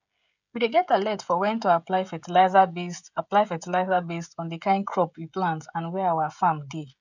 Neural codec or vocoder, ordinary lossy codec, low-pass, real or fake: codec, 16 kHz, 16 kbps, FreqCodec, smaller model; none; 7.2 kHz; fake